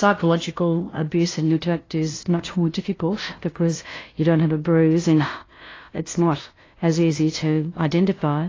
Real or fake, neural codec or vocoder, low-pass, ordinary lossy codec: fake; codec, 16 kHz, 0.5 kbps, FunCodec, trained on LibriTTS, 25 frames a second; 7.2 kHz; AAC, 32 kbps